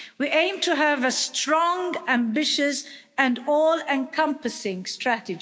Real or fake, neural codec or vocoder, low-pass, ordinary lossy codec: fake; codec, 16 kHz, 6 kbps, DAC; none; none